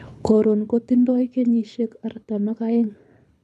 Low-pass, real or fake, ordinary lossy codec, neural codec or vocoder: none; fake; none; codec, 24 kHz, 6 kbps, HILCodec